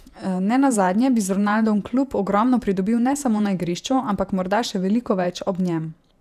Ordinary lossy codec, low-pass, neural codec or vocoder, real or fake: none; 14.4 kHz; vocoder, 48 kHz, 128 mel bands, Vocos; fake